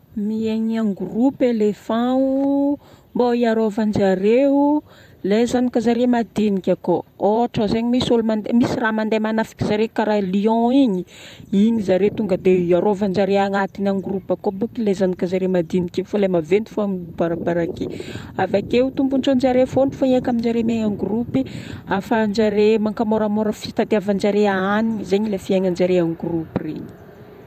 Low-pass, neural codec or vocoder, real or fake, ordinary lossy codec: 14.4 kHz; vocoder, 44.1 kHz, 128 mel bands, Pupu-Vocoder; fake; none